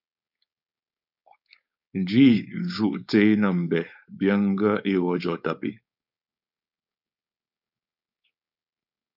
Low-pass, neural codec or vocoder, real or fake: 5.4 kHz; codec, 16 kHz, 4.8 kbps, FACodec; fake